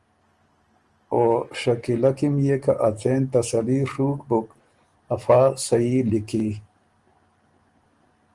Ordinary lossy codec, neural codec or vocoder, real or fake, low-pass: Opus, 24 kbps; none; real; 10.8 kHz